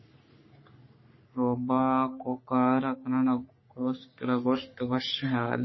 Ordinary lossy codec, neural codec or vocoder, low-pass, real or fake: MP3, 24 kbps; codec, 44.1 kHz, 3.4 kbps, Pupu-Codec; 7.2 kHz; fake